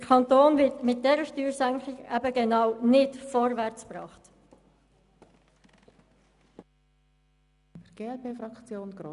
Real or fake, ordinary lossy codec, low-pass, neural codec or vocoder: real; MP3, 96 kbps; 10.8 kHz; none